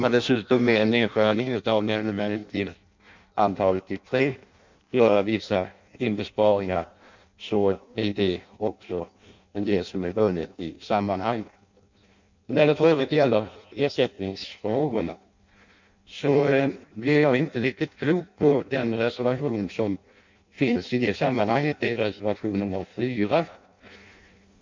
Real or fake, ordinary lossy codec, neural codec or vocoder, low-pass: fake; MP3, 64 kbps; codec, 16 kHz in and 24 kHz out, 0.6 kbps, FireRedTTS-2 codec; 7.2 kHz